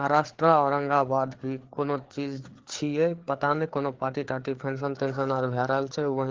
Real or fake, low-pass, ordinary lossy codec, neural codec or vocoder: fake; 7.2 kHz; Opus, 16 kbps; codec, 16 kHz, 4 kbps, FreqCodec, larger model